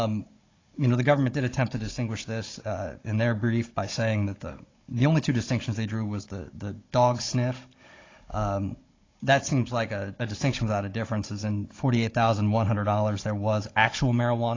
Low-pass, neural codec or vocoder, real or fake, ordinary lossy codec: 7.2 kHz; codec, 16 kHz, 16 kbps, FunCodec, trained on Chinese and English, 50 frames a second; fake; AAC, 32 kbps